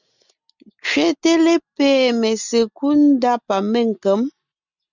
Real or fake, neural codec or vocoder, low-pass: real; none; 7.2 kHz